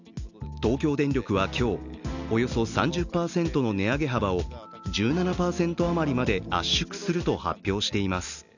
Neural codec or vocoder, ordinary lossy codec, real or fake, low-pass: none; none; real; 7.2 kHz